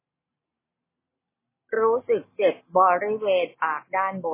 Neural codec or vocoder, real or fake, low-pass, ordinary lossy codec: none; real; 3.6 kHz; MP3, 16 kbps